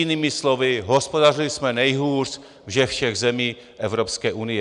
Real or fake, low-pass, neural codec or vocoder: real; 10.8 kHz; none